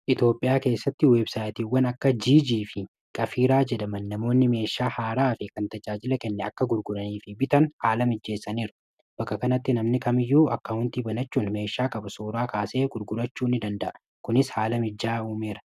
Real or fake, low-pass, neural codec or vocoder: real; 14.4 kHz; none